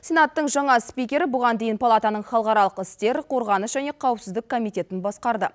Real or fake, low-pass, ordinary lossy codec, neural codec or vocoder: real; none; none; none